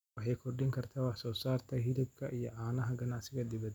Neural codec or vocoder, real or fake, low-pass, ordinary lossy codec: none; real; 19.8 kHz; none